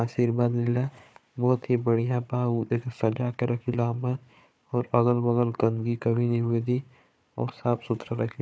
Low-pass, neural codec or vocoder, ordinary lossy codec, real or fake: none; codec, 16 kHz, 4 kbps, FunCodec, trained on Chinese and English, 50 frames a second; none; fake